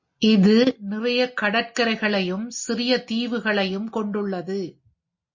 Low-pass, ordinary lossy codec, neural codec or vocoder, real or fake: 7.2 kHz; MP3, 32 kbps; none; real